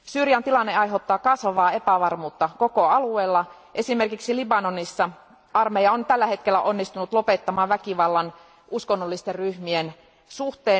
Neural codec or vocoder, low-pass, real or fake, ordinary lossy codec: none; none; real; none